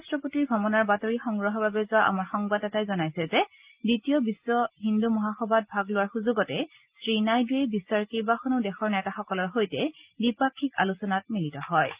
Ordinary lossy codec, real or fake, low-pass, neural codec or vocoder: Opus, 32 kbps; real; 3.6 kHz; none